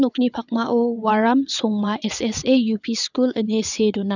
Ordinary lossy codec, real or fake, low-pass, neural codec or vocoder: none; fake; 7.2 kHz; vocoder, 22.05 kHz, 80 mel bands, WaveNeXt